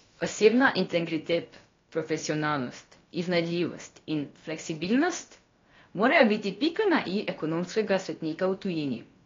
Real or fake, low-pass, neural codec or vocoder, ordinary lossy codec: fake; 7.2 kHz; codec, 16 kHz, about 1 kbps, DyCAST, with the encoder's durations; AAC, 32 kbps